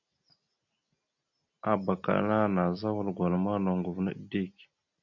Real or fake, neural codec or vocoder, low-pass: real; none; 7.2 kHz